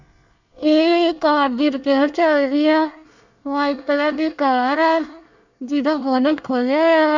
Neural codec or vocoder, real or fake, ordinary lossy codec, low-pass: codec, 24 kHz, 1 kbps, SNAC; fake; none; 7.2 kHz